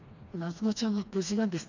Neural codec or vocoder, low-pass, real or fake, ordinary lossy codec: codec, 16 kHz, 2 kbps, FreqCodec, smaller model; 7.2 kHz; fake; none